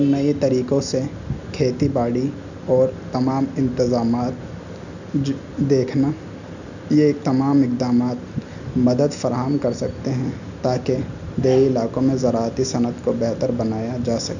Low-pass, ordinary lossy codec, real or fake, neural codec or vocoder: 7.2 kHz; none; real; none